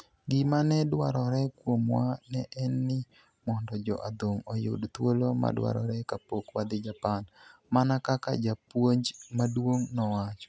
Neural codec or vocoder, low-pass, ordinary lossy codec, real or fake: none; none; none; real